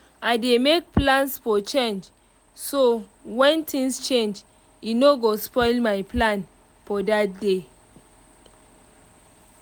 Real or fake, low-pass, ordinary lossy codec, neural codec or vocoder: real; none; none; none